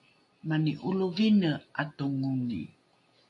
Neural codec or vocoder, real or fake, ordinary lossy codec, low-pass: none; real; AAC, 48 kbps; 10.8 kHz